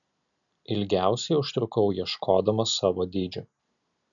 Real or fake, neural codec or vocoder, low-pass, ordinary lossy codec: real; none; 7.2 kHz; MP3, 96 kbps